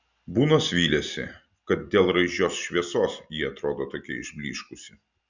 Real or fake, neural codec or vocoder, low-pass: real; none; 7.2 kHz